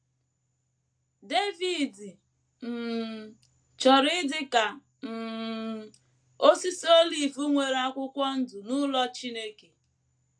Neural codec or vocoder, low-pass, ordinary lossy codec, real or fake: none; 9.9 kHz; AAC, 64 kbps; real